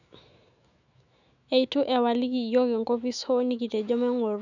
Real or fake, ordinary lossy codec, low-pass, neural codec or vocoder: fake; none; 7.2 kHz; autoencoder, 48 kHz, 128 numbers a frame, DAC-VAE, trained on Japanese speech